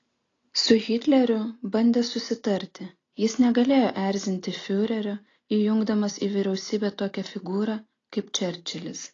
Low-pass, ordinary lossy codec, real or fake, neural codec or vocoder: 7.2 kHz; AAC, 32 kbps; real; none